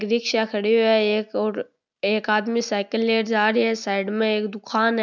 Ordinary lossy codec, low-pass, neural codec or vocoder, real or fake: none; 7.2 kHz; none; real